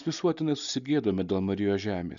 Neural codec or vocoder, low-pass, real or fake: none; 7.2 kHz; real